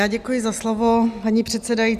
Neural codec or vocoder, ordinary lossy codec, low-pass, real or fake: none; Opus, 64 kbps; 14.4 kHz; real